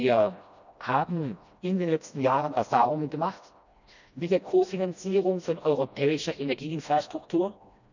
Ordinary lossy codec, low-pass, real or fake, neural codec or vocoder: none; 7.2 kHz; fake; codec, 16 kHz, 1 kbps, FreqCodec, smaller model